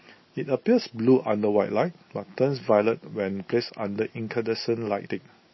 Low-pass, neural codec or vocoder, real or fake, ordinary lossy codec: 7.2 kHz; none; real; MP3, 24 kbps